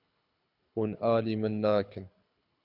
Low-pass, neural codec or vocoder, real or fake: 5.4 kHz; codec, 24 kHz, 6 kbps, HILCodec; fake